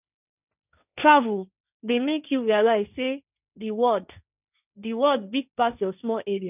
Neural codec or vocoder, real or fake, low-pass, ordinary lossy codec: codec, 16 kHz, 1.1 kbps, Voila-Tokenizer; fake; 3.6 kHz; none